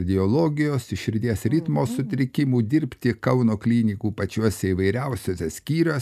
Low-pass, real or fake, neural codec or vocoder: 14.4 kHz; real; none